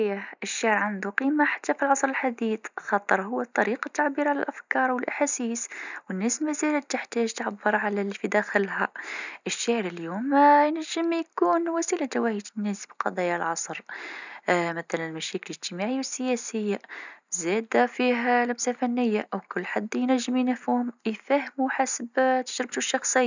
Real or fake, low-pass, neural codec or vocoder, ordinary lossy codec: real; 7.2 kHz; none; none